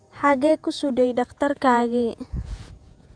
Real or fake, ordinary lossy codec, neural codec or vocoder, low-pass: fake; MP3, 96 kbps; vocoder, 48 kHz, 128 mel bands, Vocos; 9.9 kHz